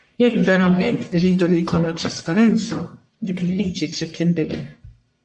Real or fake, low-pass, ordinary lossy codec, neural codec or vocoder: fake; 10.8 kHz; MP3, 48 kbps; codec, 44.1 kHz, 1.7 kbps, Pupu-Codec